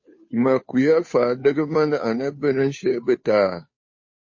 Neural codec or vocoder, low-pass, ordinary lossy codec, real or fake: codec, 16 kHz, 2 kbps, FunCodec, trained on Chinese and English, 25 frames a second; 7.2 kHz; MP3, 32 kbps; fake